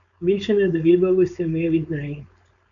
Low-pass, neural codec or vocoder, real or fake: 7.2 kHz; codec, 16 kHz, 4.8 kbps, FACodec; fake